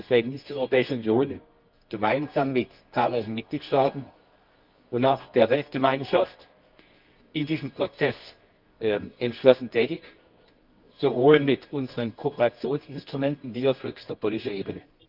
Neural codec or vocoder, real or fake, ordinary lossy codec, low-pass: codec, 24 kHz, 0.9 kbps, WavTokenizer, medium music audio release; fake; Opus, 24 kbps; 5.4 kHz